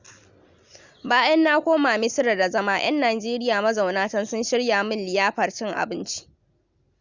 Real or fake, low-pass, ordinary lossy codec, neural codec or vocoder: real; 7.2 kHz; Opus, 64 kbps; none